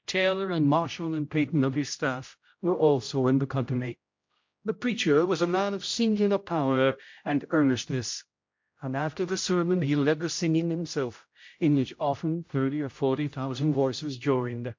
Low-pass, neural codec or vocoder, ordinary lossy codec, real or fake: 7.2 kHz; codec, 16 kHz, 0.5 kbps, X-Codec, HuBERT features, trained on general audio; MP3, 48 kbps; fake